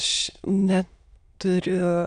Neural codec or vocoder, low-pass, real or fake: autoencoder, 22.05 kHz, a latent of 192 numbers a frame, VITS, trained on many speakers; 9.9 kHz; fake